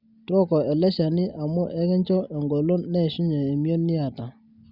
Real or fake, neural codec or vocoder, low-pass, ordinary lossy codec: real; none; 5.4 kHz; Opus, 64 kbps